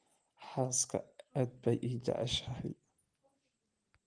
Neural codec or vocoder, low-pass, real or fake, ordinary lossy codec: none; 9.9 kHz; real; Opus, 24 kbps